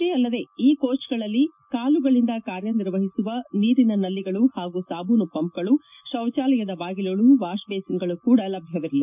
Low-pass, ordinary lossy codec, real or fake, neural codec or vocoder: 3.6 kHz; none; real; none